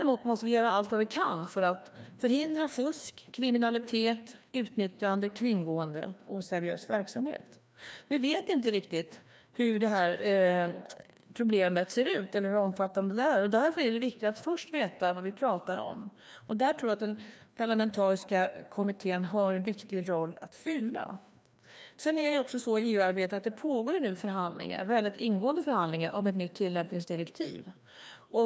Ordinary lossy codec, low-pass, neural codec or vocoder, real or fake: none; none; codec, 16 kHz, 1 kbps, FreqCodec, larger model; fake